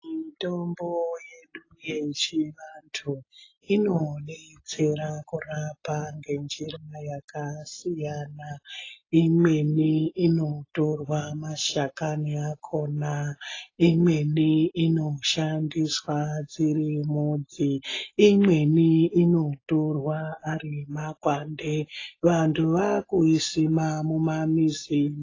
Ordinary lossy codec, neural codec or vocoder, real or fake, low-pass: AAC, 32 kbps; none; real; 7.2 kHz